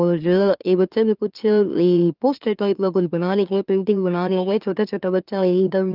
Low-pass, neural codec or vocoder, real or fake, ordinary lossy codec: 5.4 kHz; autoencoder, 44.1 kHz, a latent of 192 numbers a frame, MeloTTS; fake; Opus, 24 kbps